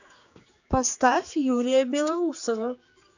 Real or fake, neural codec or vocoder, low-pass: fake; codec, 16 kHz, 4 kbps, X-Codec, HuBERT features, trained on general audio; 7.2 kHz